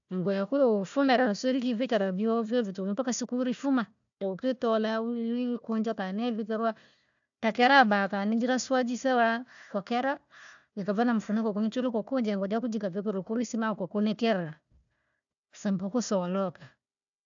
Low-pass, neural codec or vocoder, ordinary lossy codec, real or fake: 7.2 kHz; codec, 16 kHz, 1 kbps, FunCodec, trained on Chinese and English, 50 frames a second; AAC, 64 kbps; fake